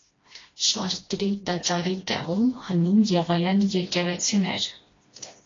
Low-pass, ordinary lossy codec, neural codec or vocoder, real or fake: 7.2 kHz; AAC, 32 kbps; codec, 16 kHz, 1 kbps, FreqCodec, smaller model; fake